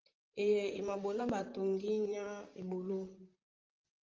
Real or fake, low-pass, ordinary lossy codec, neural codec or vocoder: fake; 7.2 kHz; Opus, 32 kbps; vocoder, 44.1 kHz, 128 mel bands, Pupu-Vocoder